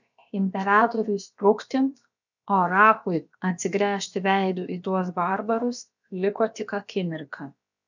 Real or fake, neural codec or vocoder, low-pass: fake; codec, 16 kHz, about 1 kbps, DyCAST, with the encoder's durations; 7.2 kHz